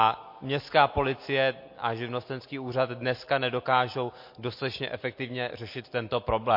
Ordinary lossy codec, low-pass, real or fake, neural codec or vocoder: MP3, 32 kbps; 5.4 kHz; real; none